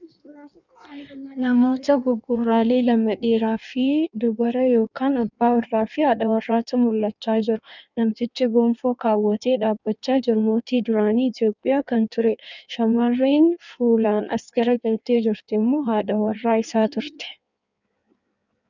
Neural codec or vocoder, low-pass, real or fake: codec, 16 kHz in and 24 kHz out, 1.1 kbps, FireRedTTS-2 codec; 7.2 kHz; fake